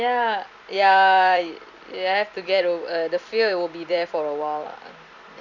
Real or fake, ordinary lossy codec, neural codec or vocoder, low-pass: fake; none; vocoder, 44.1 kHz, 128 mel bands every 256 samples, BigVGAN v2; 7.2 kHz